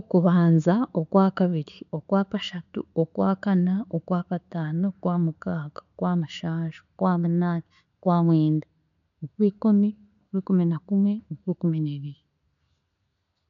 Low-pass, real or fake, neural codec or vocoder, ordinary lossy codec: 7.2 kHz; real; none; none